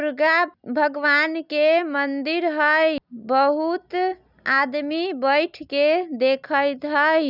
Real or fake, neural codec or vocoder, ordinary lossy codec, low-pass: real; none; none; 5.4 kHz